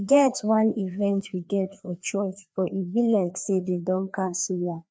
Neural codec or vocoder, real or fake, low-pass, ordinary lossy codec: codec, 16 kHz, 2 kbps, FreqCodec, larger model; fake; none; none